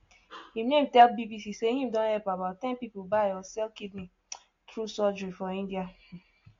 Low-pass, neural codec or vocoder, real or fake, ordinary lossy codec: 7.2 kHz; none; real; AAC, 48 kbps